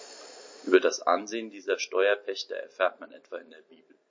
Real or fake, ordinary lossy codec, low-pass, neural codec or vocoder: real; MP3, 32 kbps; 7.2 kHz; none